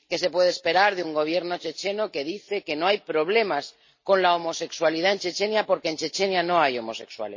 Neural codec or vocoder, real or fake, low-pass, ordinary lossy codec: none; real; 7.2 kHz; MP3, 32 kbps